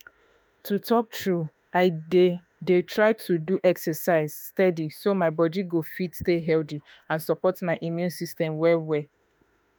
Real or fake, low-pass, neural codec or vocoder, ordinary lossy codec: fake; none; autoencoder, 48 kHz, 32 numbers a frame, DAC-VAE, trained on Japanese speech; none